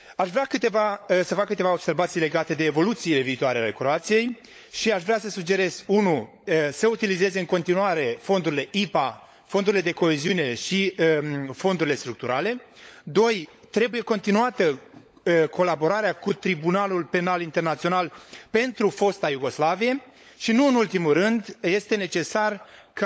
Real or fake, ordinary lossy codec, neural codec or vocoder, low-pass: fake; none; codec, 16 kHz, 16 kbps, FunCodec, trained on LibriTTS, 50 frames a second; none